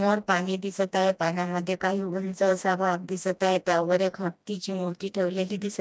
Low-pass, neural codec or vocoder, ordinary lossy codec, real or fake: none; codec, 16 kHz, 1 kbps, FreqCodec, smaller model; none; fake